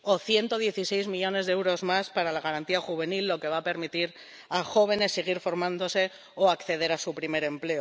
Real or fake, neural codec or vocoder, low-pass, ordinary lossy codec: real; none; none; none